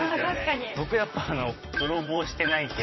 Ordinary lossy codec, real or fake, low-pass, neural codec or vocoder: MP3, 24 kbps; fake; 7.2 kHz; vocoder, 44.1 kHz, 128 mel bands, Pupu-Vocoder